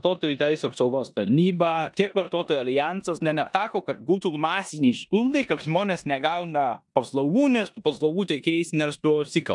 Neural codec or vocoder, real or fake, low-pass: codec, 16 kHz in and 24 kHz out, 0.9 kbps, LongCat-Audio-Codec, four codebook decoder; fake; 10.8 kHz